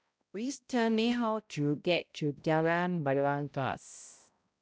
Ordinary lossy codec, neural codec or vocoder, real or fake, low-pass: none; codec, 16 kHz, 0.5 kbps, X-Codec, HuBERT features, trained on balanced general audio; fake; none